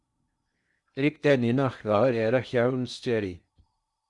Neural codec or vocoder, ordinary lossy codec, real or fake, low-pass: codec, 16 kHz in and 24 kHz out, 0.6 kbps, FocalCodec, streaming, 2048 codes; Opus, 64 kbps; fake; 10.8 kHz